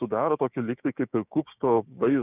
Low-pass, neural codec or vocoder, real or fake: 3.6 kHz; codec, 16 kHz, 6 kbps, DAC; fake